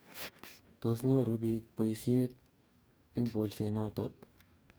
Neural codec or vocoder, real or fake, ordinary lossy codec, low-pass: codec, 44.1 kHz, 2.6 kbps, DAC; fake; none; none